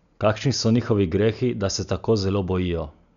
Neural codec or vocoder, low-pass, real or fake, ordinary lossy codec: none; 7.2 kHz; real; none